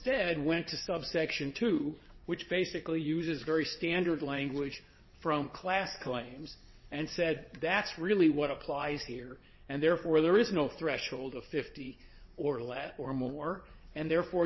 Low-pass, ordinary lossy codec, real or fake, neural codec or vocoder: 7.2 kHz; MP3, 24 kbps; fake; vocoder, 22.05 kHz, 80 mel bands, WaveNeXt